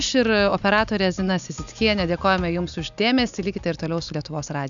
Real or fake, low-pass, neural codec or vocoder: real; 7.2 kHz; none